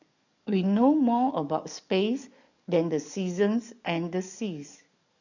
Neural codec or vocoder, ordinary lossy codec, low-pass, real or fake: codec, 44.1 kHz, 7.8 kbps, DAC; none; 7.2 kHz; fake